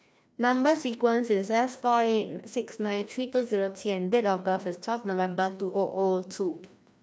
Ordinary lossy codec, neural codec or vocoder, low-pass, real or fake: none; codec, 16 kHz, 1 kbps, FreqCodec, larger model; none; fake